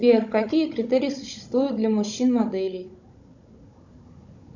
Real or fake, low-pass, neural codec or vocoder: fake; 7.2 kHz; codec, 16 kHz, 16 kbps, FunCodec, trained on Chinese and English, 50 frames a second